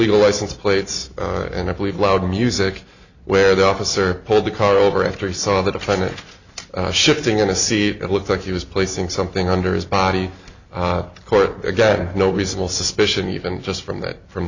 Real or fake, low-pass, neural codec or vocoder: real; 7.2 kHz; none